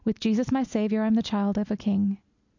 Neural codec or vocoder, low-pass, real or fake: none; 7.2 kHz; real